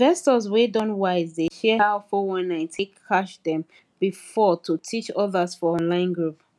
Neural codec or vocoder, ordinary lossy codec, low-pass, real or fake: none; none; none; real